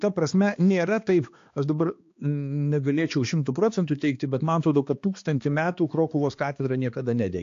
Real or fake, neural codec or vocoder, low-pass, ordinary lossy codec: fake; codec, 16 kHz, 2 kbps, X-Codec, HuBERT features, trained on balanced general audio; 7.2 kHz; AAC, 48 kbps